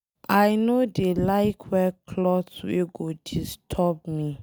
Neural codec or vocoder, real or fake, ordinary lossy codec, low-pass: none; real; none; none